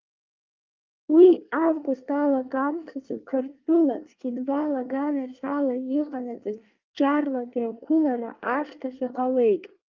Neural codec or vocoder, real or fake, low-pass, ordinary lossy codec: codec, 24 kHz, 1 kbps, SNAC; fake; 7.2 kHz; Opus, 24 kbps